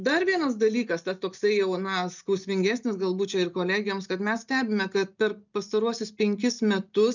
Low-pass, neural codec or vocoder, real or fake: 7.2 kHz; none; real